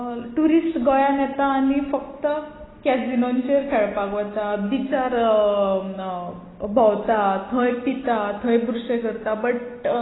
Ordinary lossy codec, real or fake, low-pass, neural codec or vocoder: AAC, 16 kbps; real; 7.2 kHz; none